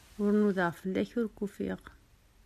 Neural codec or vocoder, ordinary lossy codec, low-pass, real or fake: none; AAC, 96 kbps; 14.4 kHz; real